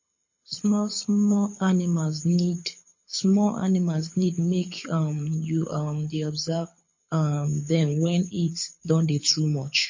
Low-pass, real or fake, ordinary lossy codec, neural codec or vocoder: 7.2 kHz; fake; MP3, 32 kbps; codec, 24 kHz, 6 kbps, HILCodec